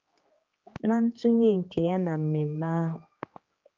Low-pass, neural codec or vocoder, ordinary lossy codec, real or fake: 7.2 kHz; codec, 16 kHz, 2 kbps, X-Codec, HuBERT features, trained on general audio; Opus, 24 kbps; fake